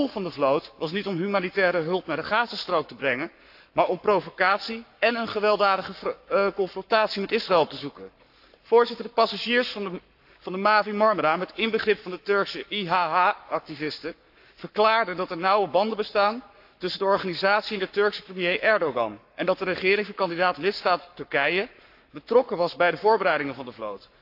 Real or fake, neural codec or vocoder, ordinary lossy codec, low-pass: fake; codec, 44.1 kHz, 7.8 kbps, Pupu-Codec; AAC, 48 kbps; 5.4 kHz